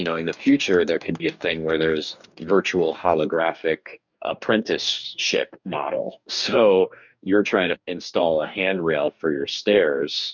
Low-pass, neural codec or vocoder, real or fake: 7.2 kHz; codec, 44.1 kHz, 2.6 kbps, DAC; fake